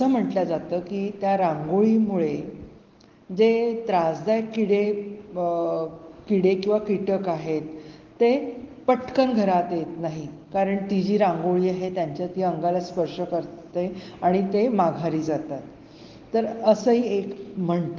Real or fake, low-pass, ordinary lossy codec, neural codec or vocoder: real; 7.2 kHz; Opus, 16 kbps; none